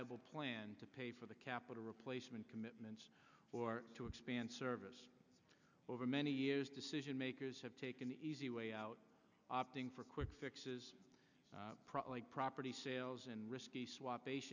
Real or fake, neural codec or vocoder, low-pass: real; none; 7.2 kHz